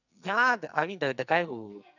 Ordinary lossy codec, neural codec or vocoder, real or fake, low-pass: none; codec, 44.1 kHz, 2.6 kbps, SNAC; fake; 7.2 kHz